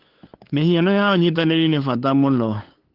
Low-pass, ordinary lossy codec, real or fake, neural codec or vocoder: 5.4 kHz; Opus, 16 kbps; fake; codec, 16 kHz, 8 kbps, FunCodec, trained on LibriTTS, 25 frames a second